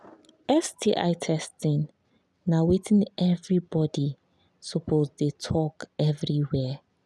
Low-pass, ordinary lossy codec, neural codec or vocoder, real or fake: none; none; none; real